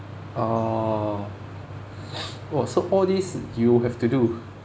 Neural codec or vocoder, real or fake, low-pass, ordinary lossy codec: none; real; none; none